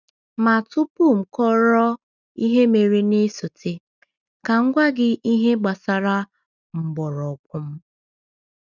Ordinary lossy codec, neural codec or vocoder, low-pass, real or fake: none; none; 7.2 kHz; real